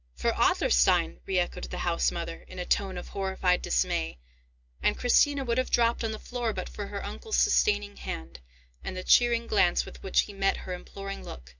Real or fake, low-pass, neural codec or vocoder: real; 7.2 kHz; none